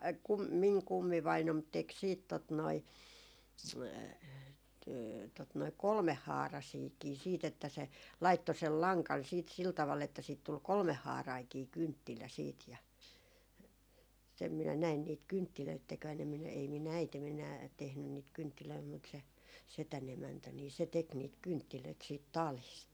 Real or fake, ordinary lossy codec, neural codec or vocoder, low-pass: real; none; none; none